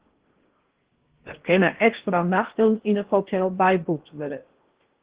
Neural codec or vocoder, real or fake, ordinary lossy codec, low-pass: codec, 16 kHz in and 24 kHz out, 0.6 kbps, FocalCodec, streaming, 4096 codes; fake; Opus, 16 kbps; 3.6 kHz